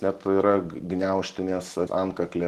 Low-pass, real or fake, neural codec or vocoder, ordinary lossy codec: 14.4 kHz; real; none; Opus, 16 kbps